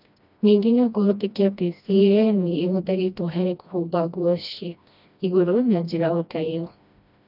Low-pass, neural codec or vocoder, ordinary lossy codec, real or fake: 5.4 kHz; codec, 16 kHz, 1 kbps, FreqCodec, smaller model; none; fake